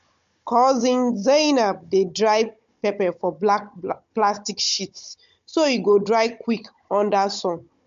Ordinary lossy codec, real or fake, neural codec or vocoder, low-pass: MP3, 48 kbps; fake; codec, 16 kHz, 16 kbps, FunCodec, trained on Chinese and English, 50 frames a second; 7.2 kHz